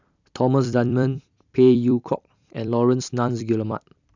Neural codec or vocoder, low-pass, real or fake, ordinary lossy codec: vocoder, 44.1 kHz, 128 mel bands every 256 samples, BigVGAN v2; 7.2 kHz; fake; none